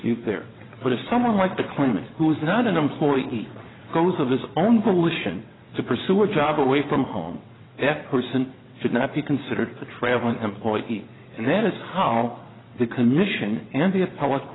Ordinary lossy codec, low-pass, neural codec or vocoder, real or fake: AAC, 16 kbps; 7.2 kHz; vocoder, 22.05 kHz, 80 mel bands, WaveNeXt; fake